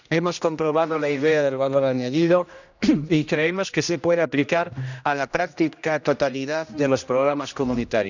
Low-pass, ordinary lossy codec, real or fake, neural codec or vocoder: 7.2 kHz; none; fake; codec, 16 kHz, 1 kbps, X-Codec, HuBERT features, trained on general audio